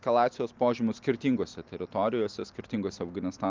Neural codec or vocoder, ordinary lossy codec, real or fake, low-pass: none; Opus, 24 kbps; real; 7.2 kHz